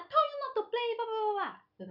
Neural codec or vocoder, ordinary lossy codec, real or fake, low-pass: none; none; real; 5.4 kHz